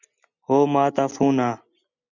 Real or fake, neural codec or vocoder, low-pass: real; none; 7.2 kHz